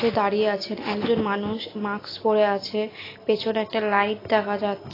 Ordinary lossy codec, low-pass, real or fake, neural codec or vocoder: AAC, 24 kbps; 5.4 kHz; real; none